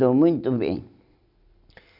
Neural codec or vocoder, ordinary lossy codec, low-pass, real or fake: none; none; 5.4 kHz; real